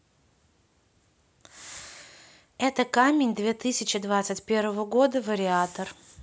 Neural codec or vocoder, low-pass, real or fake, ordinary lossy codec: none; none; real; none